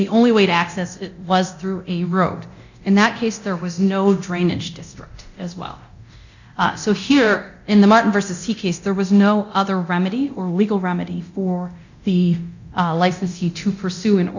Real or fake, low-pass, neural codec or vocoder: fake; 7.2 kHz; codec, 24 kHz, 0.9 kbps, DualCodec